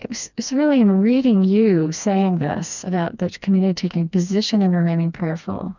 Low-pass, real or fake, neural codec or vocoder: 7.2 kHz; fake; codec, 16 kHz, 2 kbps, FreqCodec, smaller model